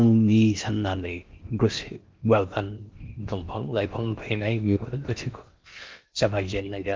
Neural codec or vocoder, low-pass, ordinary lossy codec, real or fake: codec, 16 kHz in and 24 kHz out, 0.6 kbps, FocalCodec, streaming, 4096 codes; 7.2 kHz; Opus, 32 kbps; fake